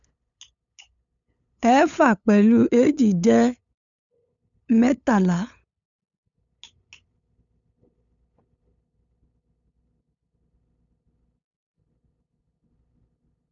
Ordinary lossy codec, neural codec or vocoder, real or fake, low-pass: none; codec, 16 kHz, 8 kbps, FunCodec, trained on LibriTTS, 25 frames a second; fake; 7.2 kHz